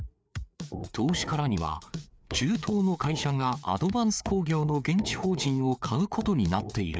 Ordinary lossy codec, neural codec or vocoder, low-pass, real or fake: none; codec, 16 kHz, 4 kbps, FreqCodec, larger model; none; fake